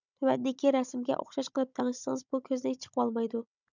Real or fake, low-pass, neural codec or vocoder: fake; 7.2 kHz; codec, 16 kHz, 16 kbps, FunCodec, trained on Chinese and English, 50 frames a second